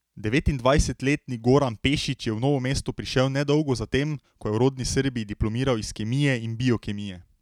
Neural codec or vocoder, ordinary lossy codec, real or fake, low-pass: none; none; real; 19.8 kHz